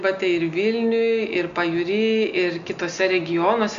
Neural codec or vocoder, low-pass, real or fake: none; 7.2 kHz; real